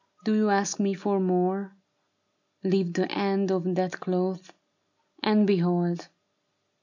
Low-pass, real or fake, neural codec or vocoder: 7.2 kHz; real; none